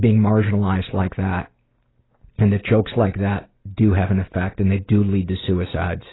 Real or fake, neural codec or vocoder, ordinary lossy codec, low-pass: real; none; AAC, 16 kbps; 7.2 kHz